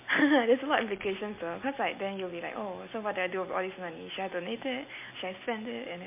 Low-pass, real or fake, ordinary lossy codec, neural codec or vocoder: 3.6 kHz; real; MP3, 24 kbps; none